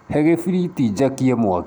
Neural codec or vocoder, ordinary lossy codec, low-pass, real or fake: none; none; none; real